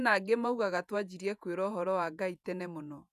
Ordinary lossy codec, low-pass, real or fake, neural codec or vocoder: none; 14.4 kHz; fake; vocoder, 48 kHz, 128 mel bands, Vocos